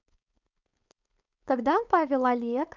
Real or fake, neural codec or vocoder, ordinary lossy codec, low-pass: fake; codec, 16 kHz, 4.8 kbps, FACodec; none; 7.2 kHz